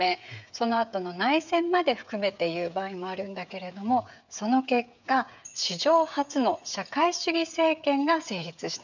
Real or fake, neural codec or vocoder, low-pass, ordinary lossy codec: fake; codec, 16 kHz, 8 kbps, FreqCodec, smaller model; 7.2 kHz; none